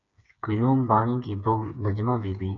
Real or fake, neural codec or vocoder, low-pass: fake; codec, 16 kHz, 4 kbps, FreqCodec, smaller model; 7.2 kHz